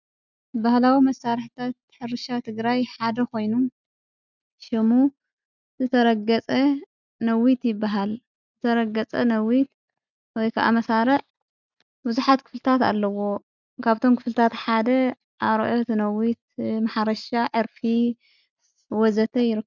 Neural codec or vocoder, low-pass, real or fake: none; 7.2 kHz; real